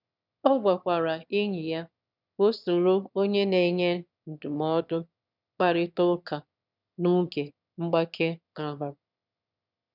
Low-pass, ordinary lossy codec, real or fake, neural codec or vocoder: 5.4 kHz; none; fake; autoencoder, 22.05 kHz, a latent of 192 numbers a frame, VITS, trained on one speaker